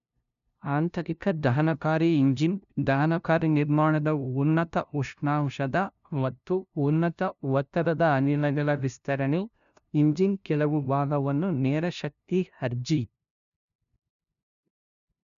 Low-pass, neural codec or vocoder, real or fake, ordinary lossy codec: 7.2 kHz; codec, 16 kHz, 0.5 kbps, FunCodec, trained on LibriTTS, 25 frames a second; fake; none